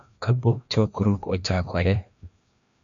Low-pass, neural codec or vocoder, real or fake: 7.2 kHz; codec, 16 kHz, 1 kbps, FreqCodec, larger model; fake